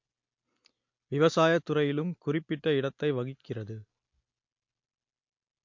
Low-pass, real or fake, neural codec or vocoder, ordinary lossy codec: 7.2 kHz; real; none; MP3, 48 kbps